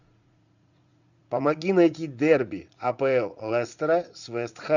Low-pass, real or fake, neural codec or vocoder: 7.2 kHz; fake; vocoder, 44.1 kHz, 80 mel bands, Vocos